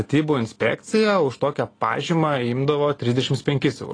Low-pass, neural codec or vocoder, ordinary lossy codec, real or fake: 9.9 kHz; none; AAC, 32 kbps; real